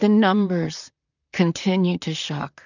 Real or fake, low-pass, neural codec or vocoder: fake; 7.2 kHz; vocoder, 44.1 kHz, 128 mel bands, Pupu-Vocoder